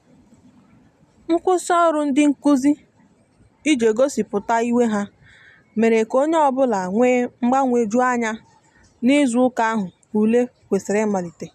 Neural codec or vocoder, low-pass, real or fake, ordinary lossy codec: none; 14.4 kHz; real; MP3, 96 kbps